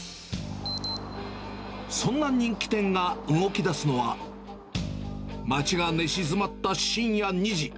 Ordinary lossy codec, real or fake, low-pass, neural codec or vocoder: none; real; none; none